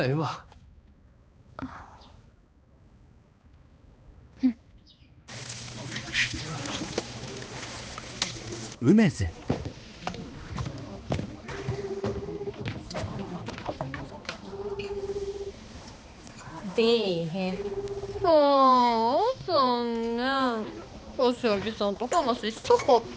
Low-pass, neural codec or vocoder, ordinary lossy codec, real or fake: none; codec, 16 kHz, 2 kbps, X-Codec, HuBERT features, trained on balanced general audio; none; fake